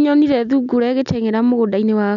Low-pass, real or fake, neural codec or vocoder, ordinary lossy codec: 7.2 kHz; real; none; none